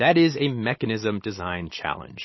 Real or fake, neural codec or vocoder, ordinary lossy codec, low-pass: real; none; MP3, 24 kbps; 7.2 kHz